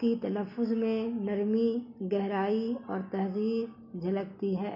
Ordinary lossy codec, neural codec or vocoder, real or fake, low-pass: MP3, 48 kbps; none; real; 5.4 kHz